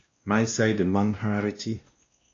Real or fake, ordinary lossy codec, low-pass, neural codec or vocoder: fake; AAC, 32 kbps; 7.2 kHz; codec, 16 kHz, 1 kbps, X-Codec, WavLM features, trained on Multilingual LibriSpeech